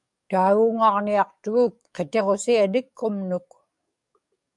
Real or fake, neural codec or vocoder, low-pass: fake; codec, 44.1 kHz, 7.8 kbps, DAC; 10.8 kHz